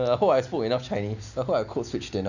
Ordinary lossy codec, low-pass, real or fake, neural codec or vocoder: none; 7.2 kHz; real; none